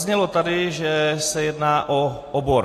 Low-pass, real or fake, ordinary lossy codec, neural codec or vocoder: 14.4 kHz; real; AAC, 48 kbps; none